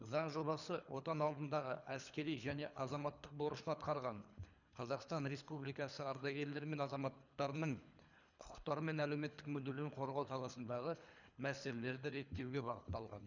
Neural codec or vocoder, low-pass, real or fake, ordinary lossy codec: codec, 24 kHz, 3 kbps, HILCodec; 7.2 kHz; fake; none